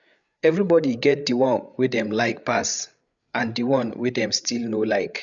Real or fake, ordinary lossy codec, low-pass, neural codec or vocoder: fake; none; 7.2 kHz; codec, 16 kHz, 8 kbps, FreqCodec, larger model